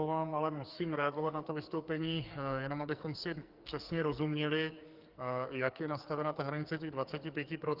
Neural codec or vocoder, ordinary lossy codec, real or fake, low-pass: codec, 44.1 kHz, 2.6 kbps, SNAC; Opus, 32 kbps; fake; 5.4 kHz